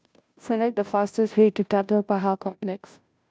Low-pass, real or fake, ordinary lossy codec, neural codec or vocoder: none; fake; none; codec, 16 kHz, 0.5 kbps, FunCodec, trained on Chinese and English, 25 frames a second